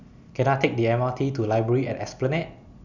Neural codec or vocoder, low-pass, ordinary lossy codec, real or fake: none; 7.2 kHz; none; real